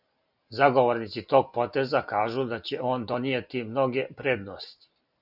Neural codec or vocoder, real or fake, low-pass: none; real; 5.4 kHz